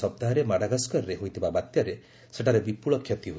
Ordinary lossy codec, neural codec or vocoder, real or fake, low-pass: none; none; real; none